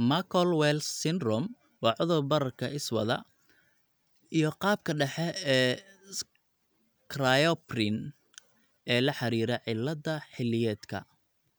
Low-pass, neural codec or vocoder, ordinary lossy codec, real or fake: none; none; none; real